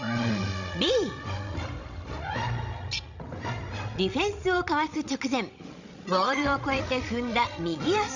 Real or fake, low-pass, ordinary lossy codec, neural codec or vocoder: fake; 7.2 kHz; none; codec, 16 kHz, 16 kbps, FreqCodec, larger model